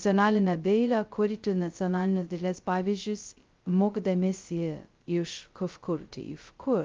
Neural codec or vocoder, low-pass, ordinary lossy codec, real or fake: codec, 16 kHz, 0.2 kbps, FocalCodec; 7.2 kHz; Opus, 32 kbps; fake